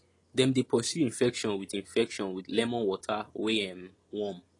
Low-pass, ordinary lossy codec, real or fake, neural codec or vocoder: 10.8 kHz; AAC, 32 kbps; real; none